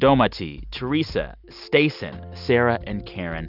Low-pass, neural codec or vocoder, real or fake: 5.4 kHz; none; real